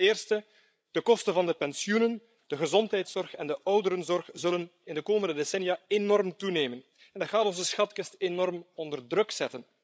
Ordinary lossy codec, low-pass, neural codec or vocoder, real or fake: none; none; codec, 16 kHz, 16 kbps, FreqCodec, larger model; fake